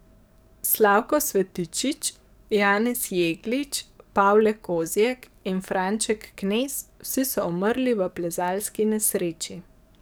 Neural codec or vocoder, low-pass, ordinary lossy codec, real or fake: codec, 44.1 kHz, 7.8 kbps, DAC; none; none; fake